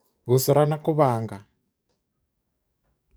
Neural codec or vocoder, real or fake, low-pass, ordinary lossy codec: codec, 44.1 kHz, 7.8 kbps, DAC; fake; none; none